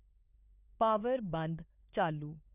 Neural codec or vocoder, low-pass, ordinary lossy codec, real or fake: codec, 16 kHz, 4 kbps, FunCodec, trained on LibriTTS, 50 frames a second; 3.6 kHz; none; fake